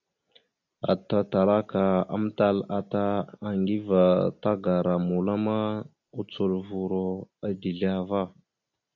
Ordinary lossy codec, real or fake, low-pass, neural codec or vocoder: AAC, 48 kbps; real; 7.2 kHz; none